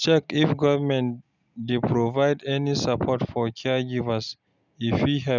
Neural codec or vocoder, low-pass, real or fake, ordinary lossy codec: none; 7.2 kHz; real; none